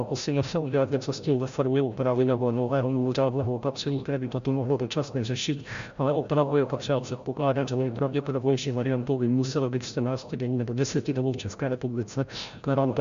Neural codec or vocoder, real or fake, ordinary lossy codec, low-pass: codec, 16 kHz, 0.5 kbps, FreqCodec, larger model; fake; Opus, 64 kbps; 7.2 kHz